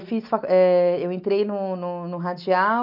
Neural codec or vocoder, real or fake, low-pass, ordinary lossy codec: none; real; 5.4 kHz; none